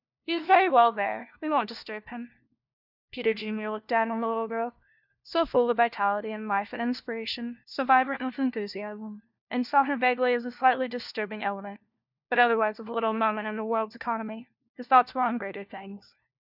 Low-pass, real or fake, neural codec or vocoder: 5.4 kHz; fake; codec, 16 kHz, 1 kbps, FunCodec, trained on LibriTTS, 50 frames a second